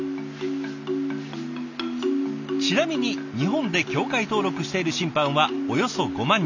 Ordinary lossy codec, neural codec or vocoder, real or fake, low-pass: none; none; real; 7.2 kHz